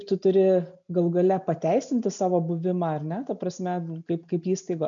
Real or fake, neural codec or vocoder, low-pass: real; none; 7.2 kHz